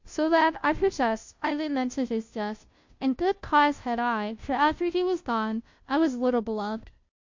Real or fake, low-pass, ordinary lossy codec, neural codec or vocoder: fake; 7.2 kHz; MP3, 48 kbps; codec, 16 kHz, 0.5 kbps, FunCodec, trained on Chinese and English, 25 frames a second